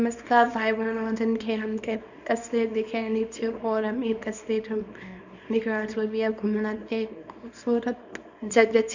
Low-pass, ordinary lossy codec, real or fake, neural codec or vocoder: 7.2 kHz; none; fake; codec, 24 kHz, 0.9 kbps, WavTokenizer, small release